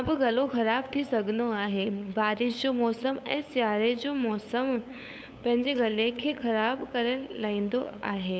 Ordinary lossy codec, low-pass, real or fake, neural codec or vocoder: none; none; fake; codec, 16 kHz, 8 kbps, FunCodec, trained on LibriTTS, 25 frames a second